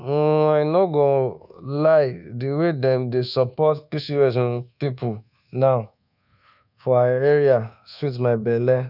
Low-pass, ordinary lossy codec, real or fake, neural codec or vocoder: 5.4 kHz; none; fake; codec, 24 kHz, 1.2 kbps, DualCodec